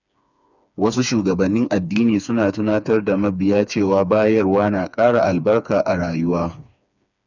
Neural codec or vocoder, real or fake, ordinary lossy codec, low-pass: codec, 16 kHz, 4 kbps, FreqCodec, smaller model; fake; none; 7.2 kHz